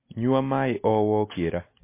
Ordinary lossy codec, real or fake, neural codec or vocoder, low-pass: MP3, 24 kbps; real; none; 3.6 kHz